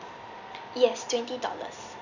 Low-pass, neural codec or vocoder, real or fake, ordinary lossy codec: 7.2 kHz; none; real; none